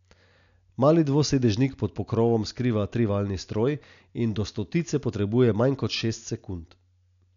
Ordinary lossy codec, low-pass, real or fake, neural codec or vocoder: none; 7.2 kHz; real; none